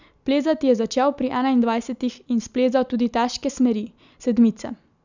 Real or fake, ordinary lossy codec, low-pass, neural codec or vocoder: real; none; 7.2 kHz; none